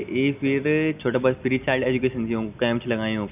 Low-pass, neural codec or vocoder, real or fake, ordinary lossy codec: 3.6 kHz; none; real; none